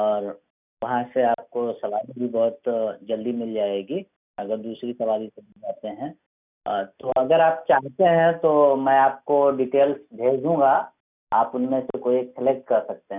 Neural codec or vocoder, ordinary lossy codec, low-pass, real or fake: none; none; 3.6 kHz; real